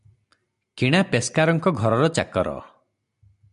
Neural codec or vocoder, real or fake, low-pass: none; real; 10.8 kHz